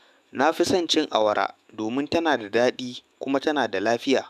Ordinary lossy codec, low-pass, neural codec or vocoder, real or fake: none; 14.4 kHz; autoencoder, 48 kHz, 128 numbers a frame, DAC-VAE, trained on Japanese speech; fake